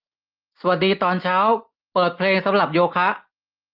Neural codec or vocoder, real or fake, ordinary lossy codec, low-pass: none; real; Opus, 32 kbps; 5.4 kHz